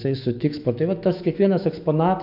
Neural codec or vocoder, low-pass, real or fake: autoencoder, 48 kHz, 128 numbers a frame, DAC-VAE, trained on Japanese speech; 5.4 kHz; fake